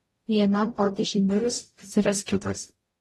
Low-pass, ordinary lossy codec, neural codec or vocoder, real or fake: 19.8 kHz; AAC, 32 kbps; codec, 44.1 kHz, 0.9 kbps, DAC; fake